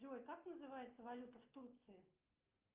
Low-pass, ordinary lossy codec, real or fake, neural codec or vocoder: 3.6 kHz; Opus, 24 kbps; real; none